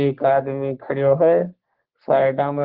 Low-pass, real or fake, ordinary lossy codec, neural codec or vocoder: 5.4 kHz; fake; Opus, 16 kbps; codec, 44.1 kHz, 3.4 kbps, Pupu-Codec